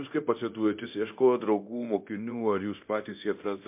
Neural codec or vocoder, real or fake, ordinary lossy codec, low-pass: codec, 24 kHz, 0.9 kbps, DualCodec; fake; MP3, 32 kbps; 3.6 kHz